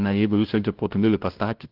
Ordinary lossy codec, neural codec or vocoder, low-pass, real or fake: Opus, 16 kbps; codec, 16 kHz, 0.5 kbps, FunCodec, trained on LibriTTS, 25 frames a second; 5.4 kHz; fake